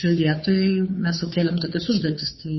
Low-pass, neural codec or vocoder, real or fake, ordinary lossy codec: 7.2 kHz; codec, 16 kHz, 2 kbps, FunCodec, trained on Chinese and English, 25 frames a second; fake; MP3, 24 kbps